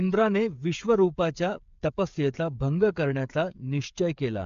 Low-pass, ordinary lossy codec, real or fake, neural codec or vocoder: 7.2 kHz; MP3, 64 kbps; fake; codec, 16 kHz, 8 kbps, FreqCodec, smaller model